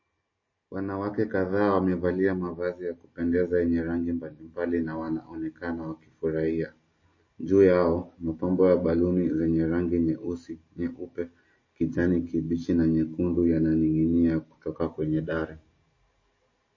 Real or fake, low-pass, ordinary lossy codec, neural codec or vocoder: real; 7.2 kHz; MP3, 32 kbps; none